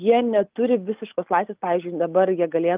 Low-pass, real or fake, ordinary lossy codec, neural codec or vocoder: 3.6 kHz; real; Opus, 24 kbps; none